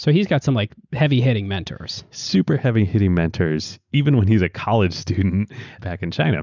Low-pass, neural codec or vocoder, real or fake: 7.2 kHz; none; real